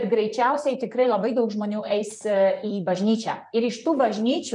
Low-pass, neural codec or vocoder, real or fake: 10.8 kHz; vocoder, 44.1 kHz, 128 mel bands, Pupu-Vocoder; fake